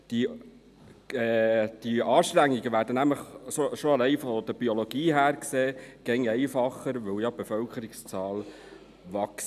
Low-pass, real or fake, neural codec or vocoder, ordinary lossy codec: 14.4 kHz; real; none; none